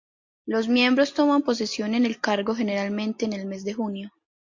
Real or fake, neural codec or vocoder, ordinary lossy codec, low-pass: real; none; MP3, 48 kbps; 7.2 kHz